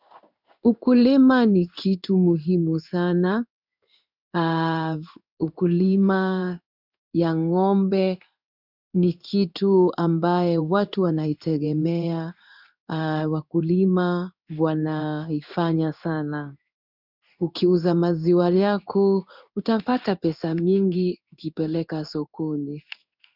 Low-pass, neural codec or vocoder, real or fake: 5.4 kHz; codec, 16 kHz in and 24 kHz out, 1 kbps, XY-Tokenizer; fake